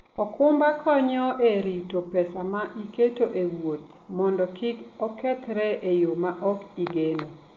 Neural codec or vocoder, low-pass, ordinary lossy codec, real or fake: none; 7.2 kHz; Opus, 32 kbps; real